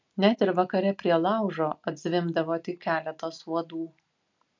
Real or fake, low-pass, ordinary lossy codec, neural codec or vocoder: real; 7.2 kHz; MP3, 64 kbps; none